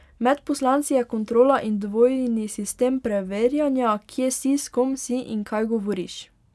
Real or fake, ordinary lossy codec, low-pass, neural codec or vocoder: real; none; none; none